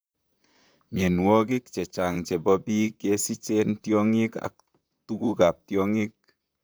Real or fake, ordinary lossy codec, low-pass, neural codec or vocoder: fake; none; none; vocoder, 44.1 kHz, 128 mel bands, Pupu-Vocoder